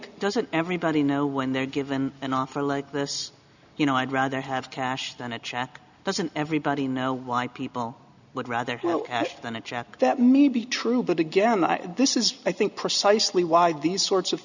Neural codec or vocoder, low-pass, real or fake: none; 7.2 kHz; real